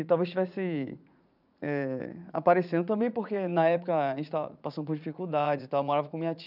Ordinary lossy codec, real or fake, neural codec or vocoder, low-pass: none; fake; vocoder, 44.1 kHz, 80 mel bands, Vocos; 5.4 kHz